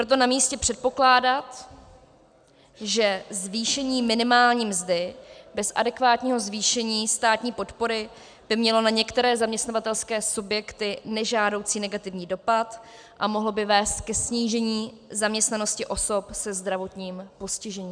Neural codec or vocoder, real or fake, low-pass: none; real; 9.9 kHz